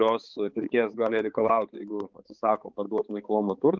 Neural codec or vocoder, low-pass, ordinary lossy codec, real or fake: codec, 16 kHz, 4 kbps, X-Codec, HuBERT features, trained on balanced general audio; 7.2 kHz; Opus, 32 kbps; fake